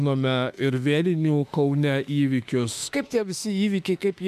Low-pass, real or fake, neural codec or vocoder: 14.4 kHz; fake; autoencoder, 48 kHz, 32 numbers a frame, DAC-VAE, trained on Japanese speech